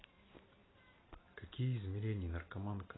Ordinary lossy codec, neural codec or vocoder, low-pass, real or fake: AAC, 16 kbps; none; 7.2 kHz; real